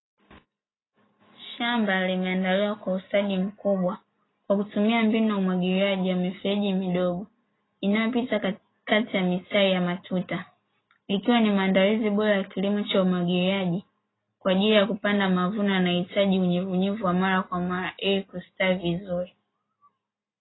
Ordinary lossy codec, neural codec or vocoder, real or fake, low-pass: AAC, 16 kbps; none; real; 7.2 kHz